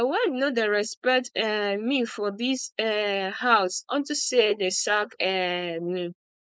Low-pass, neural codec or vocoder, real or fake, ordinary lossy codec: none; codec, 16 kHz, 4.8 kbps, FACodec; fake; none